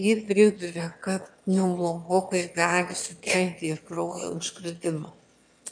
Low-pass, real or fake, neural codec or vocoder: 9.9 kHz; fake; autoencoder, 22.05 kHz, a latent of 192 numbers a frame, VITS, trained on one speaker